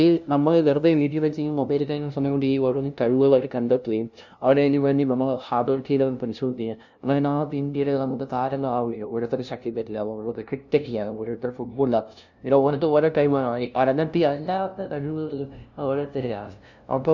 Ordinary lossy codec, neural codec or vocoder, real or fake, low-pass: none; codec, 16 kHz, 0.5 kbps, FunCodec, trained on LibriTTS, 25 frames a second; fake; 7.2 kHz